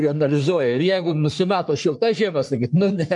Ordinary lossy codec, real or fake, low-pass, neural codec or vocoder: MP3, 96 kbps; fake; 10.8 kHz; codec, 44.1 kHz, 3.4 kbps, Pupu-Codec